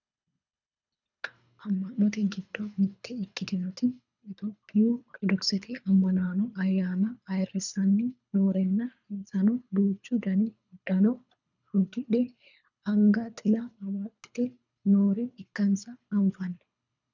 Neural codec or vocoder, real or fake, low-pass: codec, 24 kHz, 3 kbps, HILCodec; fake; 7.2 kHz